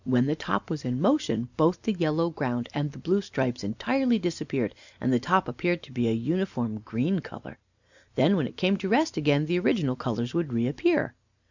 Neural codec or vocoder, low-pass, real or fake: none; 7.2 kHz; real